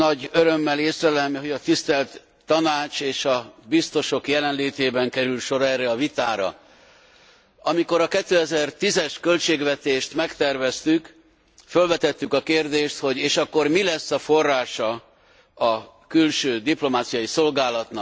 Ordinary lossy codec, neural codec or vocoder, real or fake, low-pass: none; none; real; none